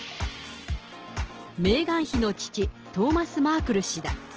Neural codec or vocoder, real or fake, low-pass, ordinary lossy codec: none; real; 7.2 kHz; Opus, 16 kbps